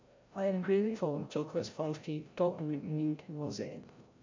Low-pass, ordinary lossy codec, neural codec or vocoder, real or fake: 7.2 kHz; MP3, 48 kbps; codec, 16 kHz, 0.5 kbps, FreqCodec, larger model; fake